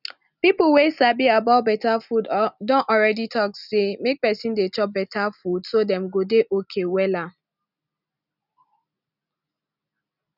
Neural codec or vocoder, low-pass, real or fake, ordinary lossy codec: none; 5.4 kHz; real; none